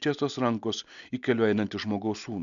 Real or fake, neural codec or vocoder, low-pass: real; none; 7.2 kHz